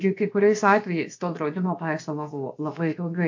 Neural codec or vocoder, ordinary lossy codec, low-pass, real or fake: codec, 16 kHz, about 1 kbps, DyCAST, with the encoder's durations; AAC, 48 kbps; 7.2 kHz; fake